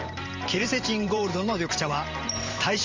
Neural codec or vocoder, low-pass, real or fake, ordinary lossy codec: vocoder, 44.1 kHz, 128 mel bands every 512 samples, BigVGAN v2; 7.2 kHz; fake; Opus, 32 kbps